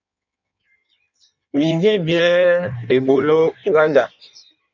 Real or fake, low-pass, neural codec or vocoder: fake; 7.2 kHz; codec, 16 kHz in and 24 kHz out, 1.1 kbps, FireRedTTS-2 codec